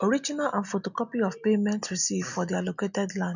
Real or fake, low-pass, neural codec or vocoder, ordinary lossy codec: real; 7.2 kHz; none; none